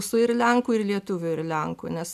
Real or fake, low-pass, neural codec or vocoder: real; 14.4 kHz; none